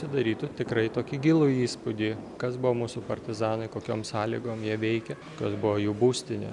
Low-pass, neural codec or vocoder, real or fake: 10.8 kHz; none; real